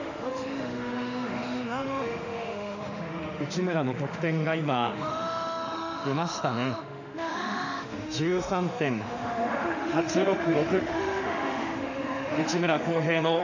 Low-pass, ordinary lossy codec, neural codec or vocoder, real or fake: 7.2 kHz; none; autoencoder, 48 kHz, 32 numbers a frame, DAC-VAE, trained on Japanese speech; fake